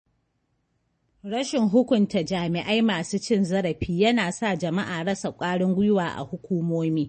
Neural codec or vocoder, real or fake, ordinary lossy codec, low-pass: none; real; MP3, 32 kbps; 10.8 kHz